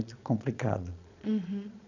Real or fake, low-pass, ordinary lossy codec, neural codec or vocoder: fake; 7.2 kHz; none; vocoder, 22.05 kHz, 80 mel bands, Vocos